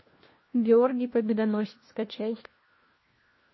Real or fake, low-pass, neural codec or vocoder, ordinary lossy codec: fake; 7.2 kHz; codec, 16 kHz, 1 kbps, FunCodec, trained on LibriTTS, 50 frames a second; MP3, 24 kbps